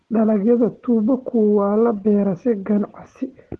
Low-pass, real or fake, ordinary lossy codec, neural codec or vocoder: 10.8 kHz; real; Opus, 16 kbps; none